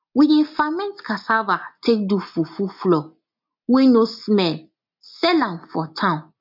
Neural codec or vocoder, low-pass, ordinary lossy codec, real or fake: none; 5.4 kHz; MP3, 48 kbps; real